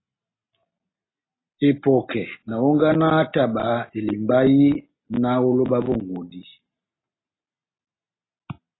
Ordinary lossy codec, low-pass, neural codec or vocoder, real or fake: AAC, 16 kbps; 7.2 kHz; none; real